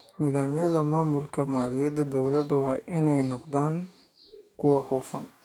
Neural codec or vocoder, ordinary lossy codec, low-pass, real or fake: codec, 44.1 kHz, 2.6 kbps, DAC; none; 19.8 kHz; fake